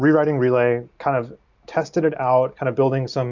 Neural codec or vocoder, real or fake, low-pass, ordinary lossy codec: none; real; 7.2 kHz; Opus, 64 kbps